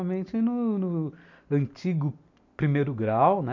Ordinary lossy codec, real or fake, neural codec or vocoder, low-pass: none; real; none; 7.2 kHz